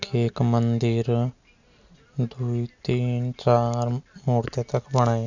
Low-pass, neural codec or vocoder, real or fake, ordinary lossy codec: 7.2 kHz; none; real; none